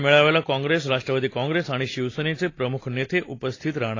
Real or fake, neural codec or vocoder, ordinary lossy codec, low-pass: real; none; AAC, 32 kbps; 7.2 kHz